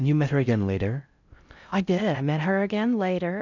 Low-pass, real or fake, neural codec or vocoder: 7.2 kHz; fake; codec, 16 kHz in and 24 kHz out, 0.6 kbps, FocalCodec, streaming, 4096 codes